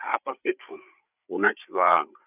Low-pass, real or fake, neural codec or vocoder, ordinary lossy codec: 3.6 kHz; fake; codec, 16 kHz, 8 kbps, FreqCodec, larger model; none